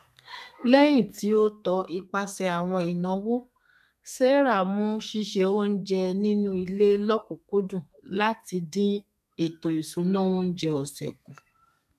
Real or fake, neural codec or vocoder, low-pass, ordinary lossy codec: fake; codec, 32 kHz, 1.9 kbps, SNAC; 14.4 kHz; none